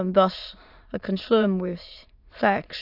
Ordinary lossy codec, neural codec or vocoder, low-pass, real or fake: AAC, 48 kbps; autoencoder, 22.05 kHz, a latent of 192 numbers a frame, VITS, trained on many speakers; 5.4 kHz; fake